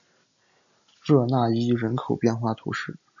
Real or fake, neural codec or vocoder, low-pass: real; none; 7.2 kHz